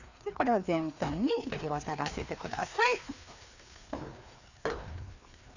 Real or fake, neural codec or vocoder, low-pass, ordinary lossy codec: fake; codec, 16 kHz, 2 kbps, FreqCodec, larger model; 7.2 kHz; none